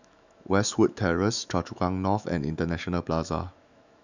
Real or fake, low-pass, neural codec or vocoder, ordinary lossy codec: fake; 7.2 kHz; autoencoder, 48 kHz, 128 numbers a frame, DAC-VAE, trained on Japanese speech; none